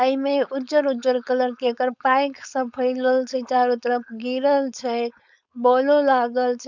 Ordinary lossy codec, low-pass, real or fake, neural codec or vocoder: none; 7.2 kHz; fake; codec, 16 kHz, 4.8 kbps, FACodec